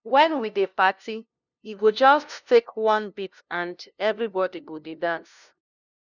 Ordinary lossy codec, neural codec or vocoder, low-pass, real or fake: none; codec, 16 kHz, 0.5 kbps, FunCodec, trained on LibriTTS, 25 frames a second; 7.2 kHz; fake